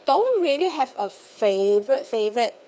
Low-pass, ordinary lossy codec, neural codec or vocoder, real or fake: none; none; codec, 16 kHz, 2 kbps, FreqCodec, larger model; fake